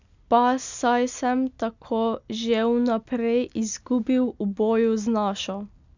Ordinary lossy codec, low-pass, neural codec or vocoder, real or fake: none; 7.2 kHz; none; real